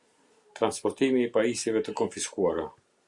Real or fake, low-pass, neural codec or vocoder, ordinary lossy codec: real; 10.8 kHz; none; Opus, 64 kbps